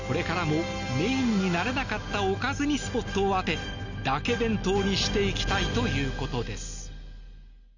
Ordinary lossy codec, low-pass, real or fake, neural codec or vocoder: none; 7.2 kHz; real; none